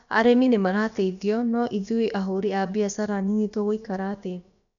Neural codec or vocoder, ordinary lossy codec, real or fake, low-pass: codec, 16 kHz, about 1 kbps, DyCAST, with the encoder's durations; none; fake; 7.2 kHz